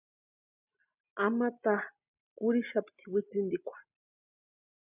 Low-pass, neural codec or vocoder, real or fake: 3.6 kHz; none; real